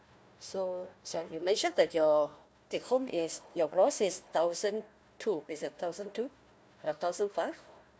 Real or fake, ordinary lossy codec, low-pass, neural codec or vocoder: fake; none; none; codec, 16 kHz, 1 kbps, FunCodec, trained on Chinese and English, 50 frames a second